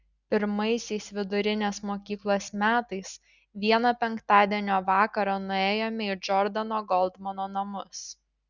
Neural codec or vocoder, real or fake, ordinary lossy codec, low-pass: none; real; Opus, 64 kbps; 7.2 kHz